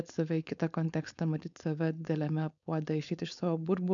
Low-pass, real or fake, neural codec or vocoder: 7.2 kHz; fake; codec, 16 kHz, 4.8 kbps, FACodec